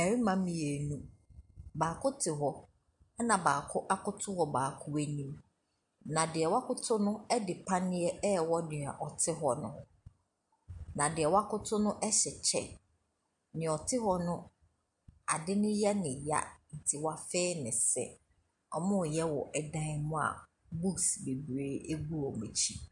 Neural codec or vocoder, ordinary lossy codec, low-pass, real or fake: none; MP3, 64 kbps; 10.8 kHz; real